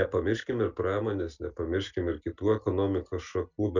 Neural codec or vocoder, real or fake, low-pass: none; real; 7.2 kHz